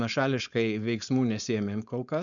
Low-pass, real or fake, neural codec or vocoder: 7.2 kHz; fake; codec, 16 kHz, 4.8 kbps, FACodec